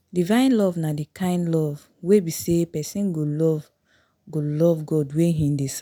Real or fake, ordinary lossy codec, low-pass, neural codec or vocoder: real; none; none; none